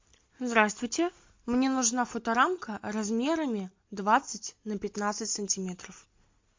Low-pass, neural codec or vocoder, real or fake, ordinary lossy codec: 7.2 kHz; none; real; MP3, 48 kbps